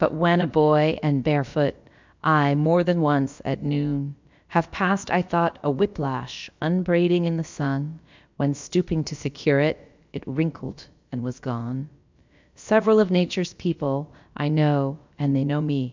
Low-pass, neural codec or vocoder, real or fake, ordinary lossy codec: 7.2 kHz; codec, 16 kHz, about 1 kbps, DyCAST, with the encoder's durations; fake; MP3, 64 kbps